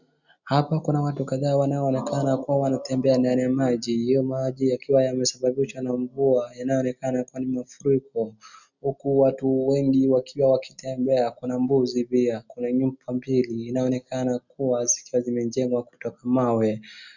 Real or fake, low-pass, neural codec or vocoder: real; 7.2 kHz; none